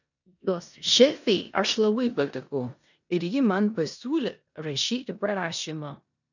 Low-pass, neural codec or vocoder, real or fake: 7.2 kHz; codec, 16 kHz in and 24 kHz out, 0.9 kbps, LongCat-Audio-Codec, four codebook decoder; fake